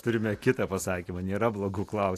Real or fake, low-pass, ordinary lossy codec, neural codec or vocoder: real; 14.4 kHz; AAC, 64 kbps; none